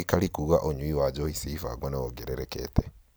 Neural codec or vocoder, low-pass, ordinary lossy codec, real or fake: none; none; none; real